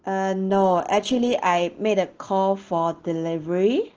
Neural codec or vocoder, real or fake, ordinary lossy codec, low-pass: none; real; Opus, 16 kbps; 7.2 kHz